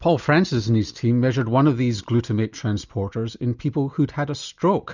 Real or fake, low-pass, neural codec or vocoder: real; 7.2 kHz; none